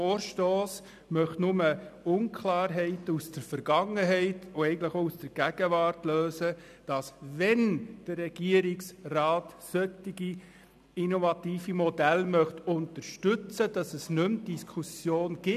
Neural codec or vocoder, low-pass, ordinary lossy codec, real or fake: none; 14.4 kHz; none; real